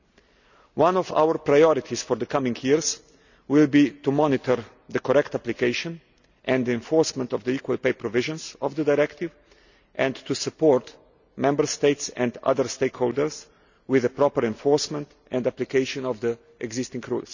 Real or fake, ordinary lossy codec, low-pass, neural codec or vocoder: real; none; 7.2 kHz; none